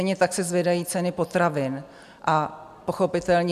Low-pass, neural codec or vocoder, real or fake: 14.4 kHz; none; real